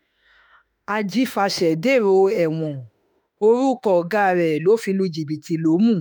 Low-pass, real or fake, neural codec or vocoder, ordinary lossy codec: none; fake; autoencoder, 48 kHz, 32 numbers a frame, DAC-VAE, trained on Japanese speech; none